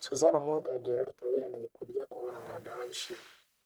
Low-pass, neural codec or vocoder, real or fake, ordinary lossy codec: none; codec, 44.1 kHz, 1.7 kbps, Pupu-Codec; fake; none